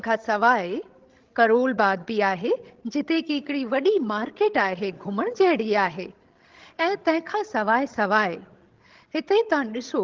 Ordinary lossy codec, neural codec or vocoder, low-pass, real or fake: Opus, 16 kbps; codec, 16 kHz, 16 kbps, FreqCodec, larger model; 7.2 kHz; fake